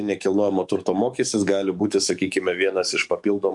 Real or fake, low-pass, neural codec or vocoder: fake; 10.8 kHz; codec, 44.1 kHz, 7.8 kbps, DAC